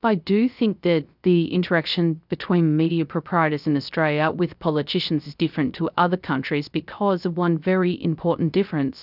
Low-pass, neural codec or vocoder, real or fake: 5.4 kHz; codec, 16 kHz, 0.3 kbps, FocalCodec; fake